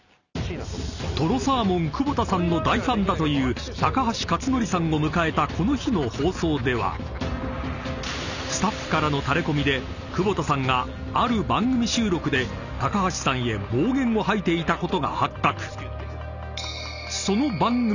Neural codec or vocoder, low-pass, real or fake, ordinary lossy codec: none; 7.2 kHz; real; none